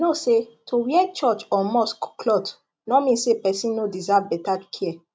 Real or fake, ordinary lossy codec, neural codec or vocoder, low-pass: real; none; none; none